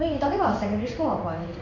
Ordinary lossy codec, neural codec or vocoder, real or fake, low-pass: none; codec, 16 kHz in and 24 kHz out, 1 kbps, XY-Tokenizer; fake; 7.2 kHz